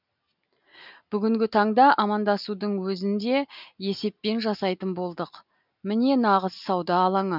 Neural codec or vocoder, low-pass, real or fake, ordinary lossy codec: none; 5.4 kHz; real; none